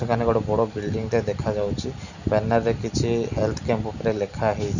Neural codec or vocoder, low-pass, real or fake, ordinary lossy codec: none; 7.2 kHz; real; none